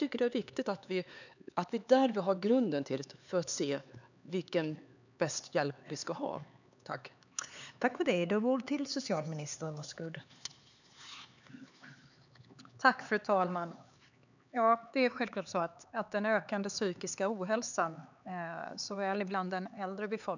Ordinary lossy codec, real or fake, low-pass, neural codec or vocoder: none; fake; 7.2 kHz; codec, 16 kHz, 4 kbps, X-Codec, HuBERT features, trained on LibriSpeech